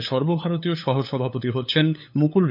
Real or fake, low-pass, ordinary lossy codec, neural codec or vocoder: fake; 5.4 kHz; none; codec, 16 kHz, 8 kbps, FunCodec, trained on LibriTTS, 25 frames a second